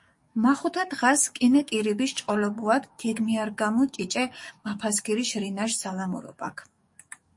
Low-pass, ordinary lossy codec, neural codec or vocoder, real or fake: 10.8 kHz; MP3, 48 kbps; codec, 44.1 kHz, 7.8 kbps, DAC; fake